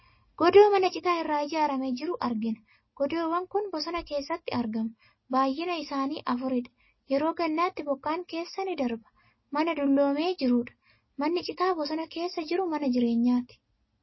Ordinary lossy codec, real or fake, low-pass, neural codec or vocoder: MP3, 24 kbps; real; 7.2 kHz; none